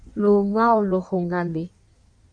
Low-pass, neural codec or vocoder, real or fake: 9.9 kHz; codec, 16 kHz in and 24 kHz out, 1.1 kbps, FireRedTTS-2 codec; fake